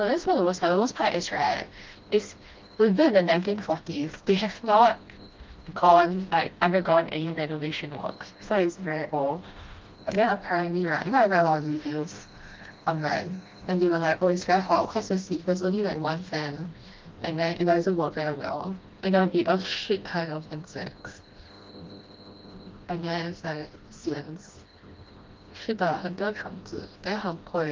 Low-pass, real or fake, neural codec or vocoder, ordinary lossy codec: 7.2 kHz; fake; codec, 16 kHz, 1 kbps, FreqCodec, smaller model; Opus, 32 kbps